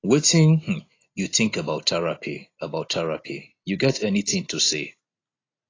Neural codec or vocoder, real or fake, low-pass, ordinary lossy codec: none; real; 7.2 kHz; AAC, 32 kbps